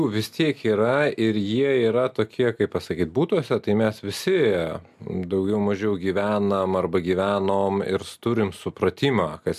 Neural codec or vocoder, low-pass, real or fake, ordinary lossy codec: none; 14.4 kHz; real; MP3, 96 kbps